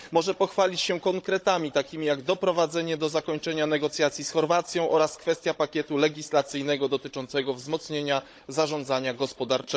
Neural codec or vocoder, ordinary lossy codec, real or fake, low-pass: codec, 16 kHz, 16 kbps, FunCodec, trained on Chinese and English, 50 frames a second; none; fake; none